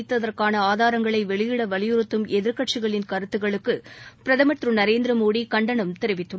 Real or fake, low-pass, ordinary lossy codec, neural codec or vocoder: real; none; none; none